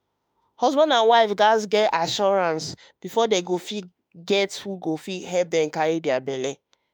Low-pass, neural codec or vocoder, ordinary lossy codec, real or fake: none; autoencoder, 48 kHz, 32 numbers a frame, DAC-VAE, trained on Japanese speech; none; fake